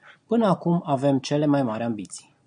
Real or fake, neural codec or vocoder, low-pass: real; none; 9.9 kHz